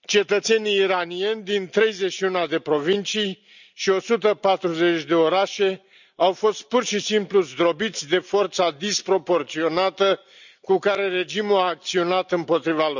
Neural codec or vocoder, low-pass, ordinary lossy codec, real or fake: none; 7.2 kHz; none; real